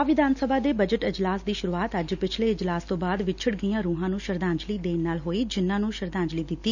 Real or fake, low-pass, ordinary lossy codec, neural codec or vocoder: real; 7.2 kHz; none; none